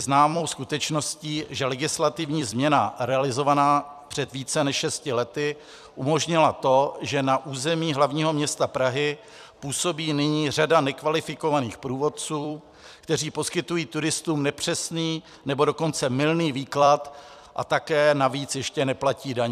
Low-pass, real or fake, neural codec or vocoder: 14.4 kHz; fake; vocoder, 44.1 kHz, 128 mel bands every 256 samples, BigVGAN v2